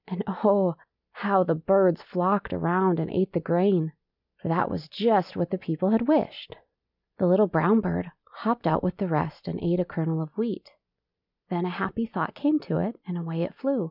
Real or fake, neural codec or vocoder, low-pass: real; none; 5.4 kHz